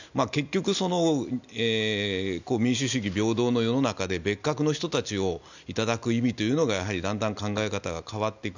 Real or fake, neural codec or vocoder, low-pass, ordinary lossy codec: real; none; 7.2 kHz; none